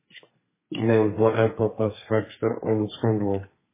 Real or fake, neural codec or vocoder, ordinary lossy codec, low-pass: fake; codec, 32 kHz, 1.9 kbps, SNAC; MP3, 16 kbps; 3.6 kHz